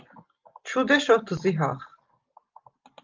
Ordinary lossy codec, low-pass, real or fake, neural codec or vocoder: Opus, 24 kbps; 7.2 kHz; real; none